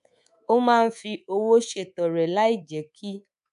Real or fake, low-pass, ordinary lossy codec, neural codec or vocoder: fake; 10.8 kHz; none; codec, 24 kHz, 3.1 kbps, DualCodec